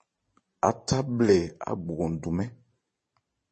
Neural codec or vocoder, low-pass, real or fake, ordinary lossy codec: vocoder, 44.1 kHz, 128 mel bands every 512 samples, BigVGAN v2; 10.8 kHz; fake; MP3, 32 kbps